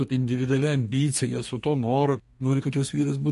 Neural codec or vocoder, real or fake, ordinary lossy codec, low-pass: codec, 32 kHz, 1.9 kbps, SNAC; fake; MP3, 48 kbps; 14.4 kHz